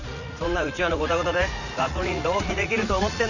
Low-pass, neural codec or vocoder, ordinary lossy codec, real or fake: 7.2 kHz; vocoder, 44.1 kHz, 80 mel bands, Vocos; none; fake